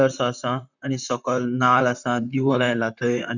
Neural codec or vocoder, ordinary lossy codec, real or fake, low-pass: vocoder, 44.1 kHz, 128 mel bands, Pupu-Vocoder; none; fake; 7.2 kHz